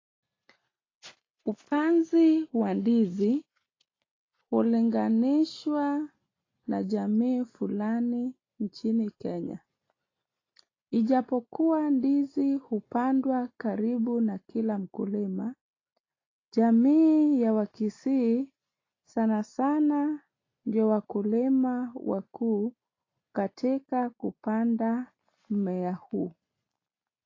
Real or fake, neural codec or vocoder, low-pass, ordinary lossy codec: real; none; 7.2 kHz; AAC, 32 kbps